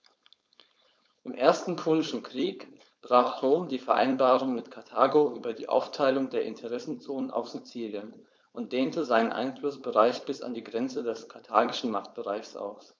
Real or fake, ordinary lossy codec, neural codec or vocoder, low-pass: fake; none; codec, 16 kHz, 4.8 kbps, FACodec; none